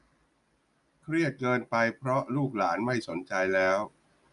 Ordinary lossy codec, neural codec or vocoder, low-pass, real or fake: AAC, 96 kbps; none; 10.8 kHz; real